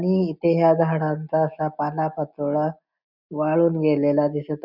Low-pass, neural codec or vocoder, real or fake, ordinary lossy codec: 5.4 kHz; none; real; none